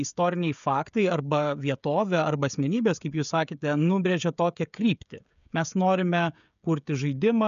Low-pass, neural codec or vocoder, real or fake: 7.2 kHz; codec, 16 kHz, 16 kbps, FreqCodec, smaller model; fake